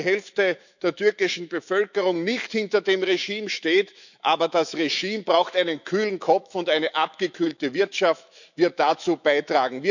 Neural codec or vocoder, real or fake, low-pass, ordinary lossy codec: autoencoder, 48 kHz, 128 numbers a frame, DAC-VAE, trained on Japanese speech; fake; 7.2 kHz; none